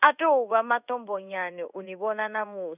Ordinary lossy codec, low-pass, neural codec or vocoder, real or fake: none; 3.6 kHz; codec, 16 kHz in and 24 kHz out, 1 kbps, XY-Tokenizer; fake